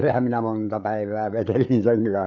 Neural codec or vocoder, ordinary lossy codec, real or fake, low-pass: codec, 16 kHz, 8 kbps, FreqCodec, larger model; none; fake; 7.2 kHz